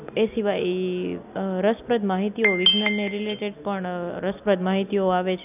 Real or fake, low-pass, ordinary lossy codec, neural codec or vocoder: real; 3.6 kHz; none; none